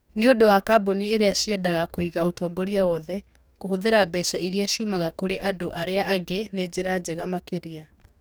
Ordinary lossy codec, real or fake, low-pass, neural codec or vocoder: none; fake; none; codec, 44.1 kHz, 2.6 kbps, DAC